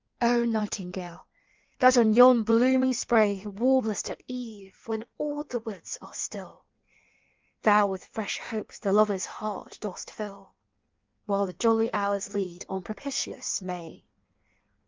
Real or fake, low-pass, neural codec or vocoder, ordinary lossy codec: fake; 7.2 kHz; codec, 16 kHz in and 24 kHz out, 1.1 kbps, FireRedTTS-2 codec; Opus, 24 kbps